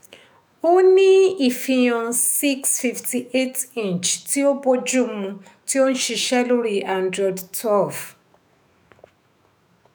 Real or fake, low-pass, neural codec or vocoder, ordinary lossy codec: fake; none; autoencoder, 48 kHz, 128 numbers a frame, DAC-VAE, trained on Japanese speech; none